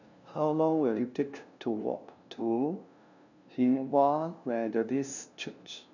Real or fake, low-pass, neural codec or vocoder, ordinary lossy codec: fake; 7.2 kHz; codec, 16 kHz, 0.5 kbps, FunCodec, trained on LibriTTS, 25 frames a second; none